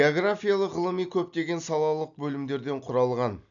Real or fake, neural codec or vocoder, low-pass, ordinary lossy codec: real; none; 7.2 kHz; none